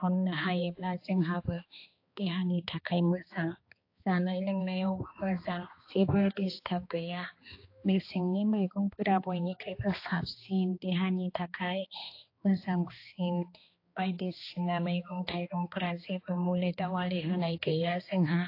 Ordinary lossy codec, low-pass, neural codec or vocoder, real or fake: AAC, 32 kbps; 5.4 kHz; codec, 16 kHz, 2 kbps, X-Codec, HuBERT features, trained on balanced general audio; fake